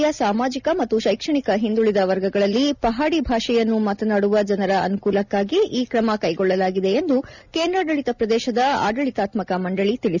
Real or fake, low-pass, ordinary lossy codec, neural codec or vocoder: real; 7.2 kHz; none; none